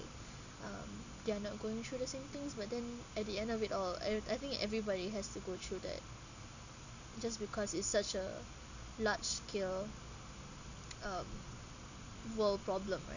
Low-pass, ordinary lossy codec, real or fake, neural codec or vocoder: 7.2 kHz; none; real; none